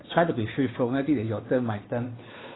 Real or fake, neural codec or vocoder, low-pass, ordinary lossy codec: fake; codec, 16 kHz, 2 kbps, FunCodec, trained on Chinese and English, 25 frames a second; 7.2 kHz; AAC, 16 kbps